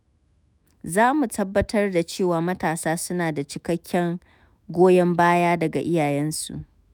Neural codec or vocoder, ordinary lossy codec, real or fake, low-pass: autoencoder, 48 kHz, 128 numbers a frame, DAC-VAE, trained on Japanese speech; none; fake; none